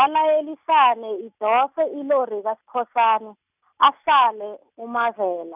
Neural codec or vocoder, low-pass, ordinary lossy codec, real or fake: none; 3.6 kHz; none; real